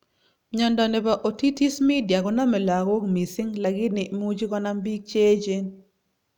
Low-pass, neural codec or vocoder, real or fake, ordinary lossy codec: 19.8 kHz; none; real; none